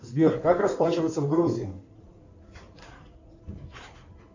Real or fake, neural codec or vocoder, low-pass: fake; codec, 16 kHz in and 24 kHz out, 2.2 kbps, FireRedTTS-2 codec; 7.2 kHz